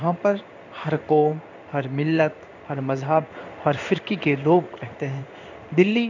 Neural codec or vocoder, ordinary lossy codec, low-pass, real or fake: codec, 16 kHz in and 24 kHz out, 1 kbps, XY-Tokenizer; none; 7.2 kHz; fake